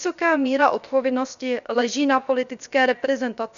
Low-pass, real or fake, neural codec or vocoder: 7.2 kHz; fake; codec, 16 kHz, about 1 kbps, DyCAST, with the encoder's durations